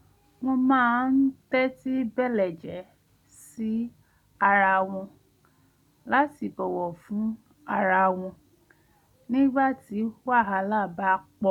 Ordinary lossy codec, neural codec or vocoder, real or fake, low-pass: none; none; real; 19.8 kHz